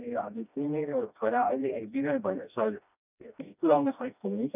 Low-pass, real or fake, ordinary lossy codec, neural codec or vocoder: 3.6 kHz; fake; none; codec, 16 kHz, 1 kbps, FreqCodec, smaller model